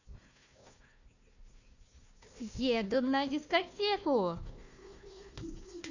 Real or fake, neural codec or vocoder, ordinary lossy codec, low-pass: fake; codec, 16 kHz, 1 kbps, FunCodec, trained on Chinese and English, 50 frames a second; none; 7.2 kHz